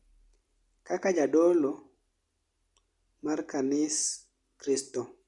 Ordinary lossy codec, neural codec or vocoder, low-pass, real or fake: Opus, 64 kbps; none; 10.8 kHz; real